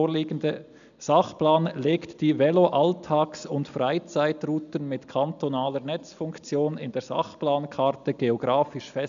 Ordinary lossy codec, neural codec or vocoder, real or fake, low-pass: none; none; real; 7.2 kHz